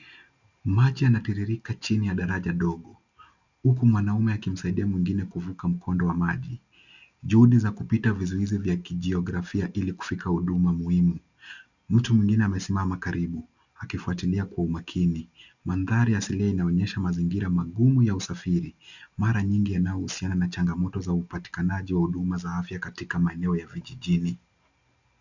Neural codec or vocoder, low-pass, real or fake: none; 7.2 kHz; real